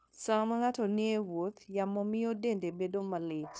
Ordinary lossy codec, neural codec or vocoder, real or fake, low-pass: none; codec, 16 kHz, 0.9 kbps, LongCat-Audio-Codec; fake; none